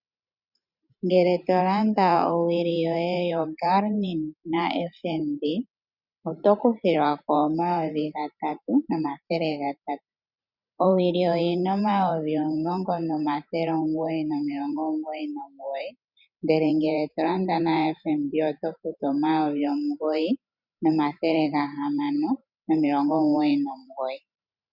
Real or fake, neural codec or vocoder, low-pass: fake; vocoder, 44.1 kHz, 128 mel bands every 512 samples, BigVGAN v2; 5.4 kHz